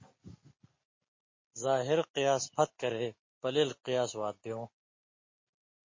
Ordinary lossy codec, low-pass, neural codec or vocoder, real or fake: MP3, 32 kbps; 7.2 kHz; none; real